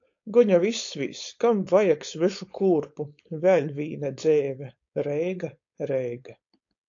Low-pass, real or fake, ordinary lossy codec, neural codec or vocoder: 7.2 kHz; fake; AAC, 48 kbps; codec, 16 kHz, 4.8 kbps, FACodec